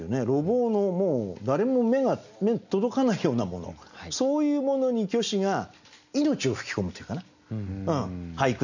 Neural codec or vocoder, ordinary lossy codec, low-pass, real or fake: none; none; 7.2 kHz; real